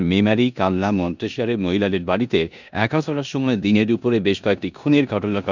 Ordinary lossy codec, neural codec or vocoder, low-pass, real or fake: none; codec, 16 kHz in and 24 kHz out, 0.9 kbps, LongCat-Audio-Codec, four codebook decoder; 7.2 kHz; fake